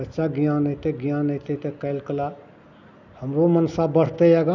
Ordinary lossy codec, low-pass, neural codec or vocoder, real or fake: none; 7.2 kHz; none; real